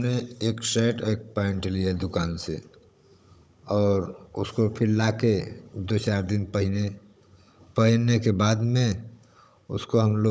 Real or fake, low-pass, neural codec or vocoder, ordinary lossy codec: fake; none; codec, 16 kHz, 16 kbps, FunCodec, trained on Chinese and English, 50 frames a second; none